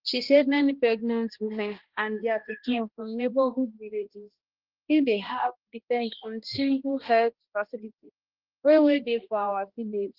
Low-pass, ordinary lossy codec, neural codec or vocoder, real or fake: 5.4 kHz; Opus, 16 kbps; codec, 16 kHz, 1 kbps, X-Codec, HuBERT features, trained on balanced general audio; fake